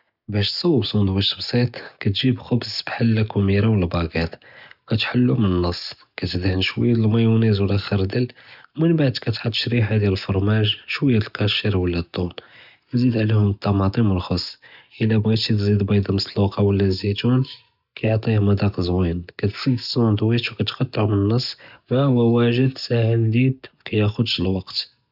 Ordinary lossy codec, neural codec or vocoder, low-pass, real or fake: none; none; 5.4 kHz; real